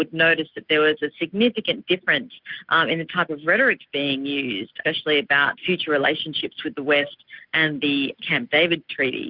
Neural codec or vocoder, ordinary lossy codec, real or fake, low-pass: none; Opus, 64 kbps; real; 5.4 kHz